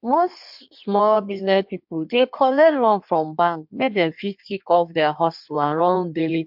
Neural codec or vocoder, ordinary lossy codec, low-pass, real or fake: codec, 16 kHz in and 24 kHz out, 1.1 kbps, FireRedTTS-2 codec; MP3, 48 kbps; 5.4 kHz; fake